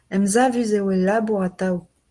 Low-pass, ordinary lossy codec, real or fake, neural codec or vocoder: 10.8 kHz; Opus, 24 kbps; real; none